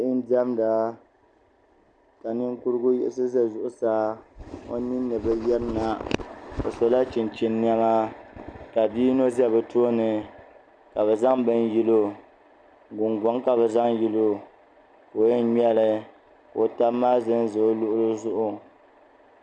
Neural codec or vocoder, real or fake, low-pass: none; real; 9.9 kHz